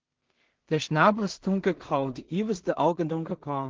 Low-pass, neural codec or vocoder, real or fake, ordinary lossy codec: 7.2 kHz; codec, 16 kHz in and 24 kHz out, 0.4 kbps, LongCat-Audio-Codec, two codebook decoder; fake; Opus, 16 kbps